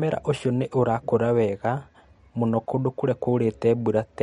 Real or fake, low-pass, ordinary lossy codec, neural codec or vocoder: real; 19.8 kHz; MP3, 48 kbps; none